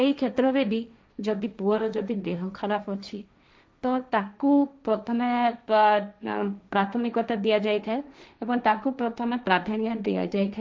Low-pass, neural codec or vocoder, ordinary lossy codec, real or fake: none; codec, 16 kHz, 1.1 kbps, Voila-Tokenizer; none; fake